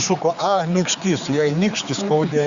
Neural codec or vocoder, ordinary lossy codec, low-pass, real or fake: codec, 16 kHz, 4 kbps, X-Codec, HuBERT features, trained on general audio; Opus, 64 kbps; 7.2 kHz; fake